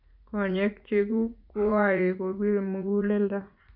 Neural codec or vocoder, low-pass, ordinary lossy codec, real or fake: vocoder, 44.1 kHz, 80 mel bands, Vocos; 5.4 kHz; none; fake